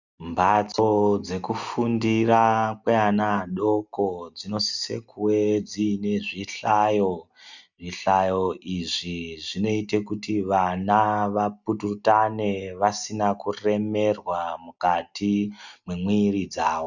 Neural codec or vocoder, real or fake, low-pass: none; real; 7.2 kHz